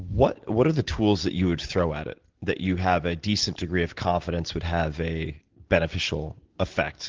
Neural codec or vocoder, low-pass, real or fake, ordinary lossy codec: none; 7.2 kHz; real; Opus, 16 kbps